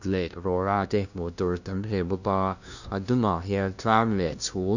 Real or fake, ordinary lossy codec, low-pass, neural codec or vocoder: fake; none; 7.2 kHz; codec, 16 kHz, 0.5 kbps, FunCodec, trained on LibriTTS, 25 frames a second